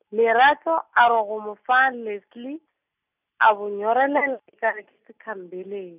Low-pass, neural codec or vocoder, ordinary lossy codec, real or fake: 3.6 kHz; none; none; real